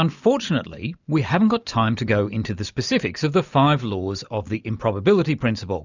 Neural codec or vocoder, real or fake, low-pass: none; real; 7.2 kHz